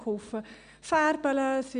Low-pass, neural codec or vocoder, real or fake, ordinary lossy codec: 9.9 kHz; none; real; none